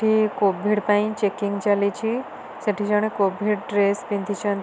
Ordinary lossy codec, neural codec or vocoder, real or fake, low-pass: none; none; real; none